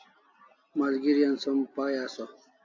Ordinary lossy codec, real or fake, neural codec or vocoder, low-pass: AAC, 48 kbps; real; none; 7.2 kHz